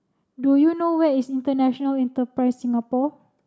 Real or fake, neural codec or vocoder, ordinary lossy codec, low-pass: real; none; none; none